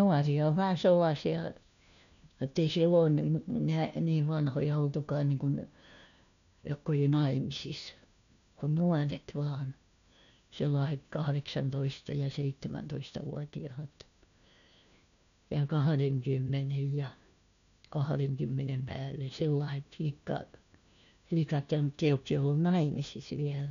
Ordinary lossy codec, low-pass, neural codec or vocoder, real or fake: none; 7.2 kHz; codec, 16 kHz, 1 kbps, FunCodec, trained on LibriTTS, 50 frames a second; fake